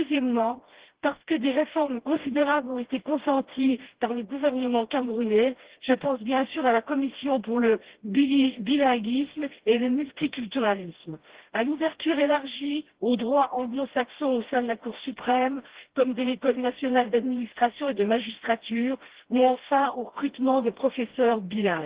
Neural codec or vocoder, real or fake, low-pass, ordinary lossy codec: codec, 16 kHz, 1 kbps, FreqCodec, smaller model; fake; 3.6 kHz; Opus, 16 kbps